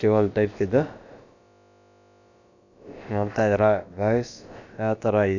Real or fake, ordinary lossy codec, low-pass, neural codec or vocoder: fake; none; 7.2 kHz; codec, 16 kHz, about 1 kbps, DyCAST, with the encoder's durations